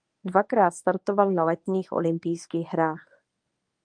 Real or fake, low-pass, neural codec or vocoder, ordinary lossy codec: fake; 9.9 kHz; codec, 24 kHz, 0.9 kbps, WavTokenizer, medium speech release version 1; Opus, 32 kbps